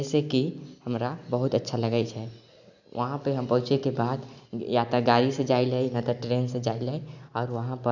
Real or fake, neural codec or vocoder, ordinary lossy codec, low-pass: real; none; none; 7.2 kHz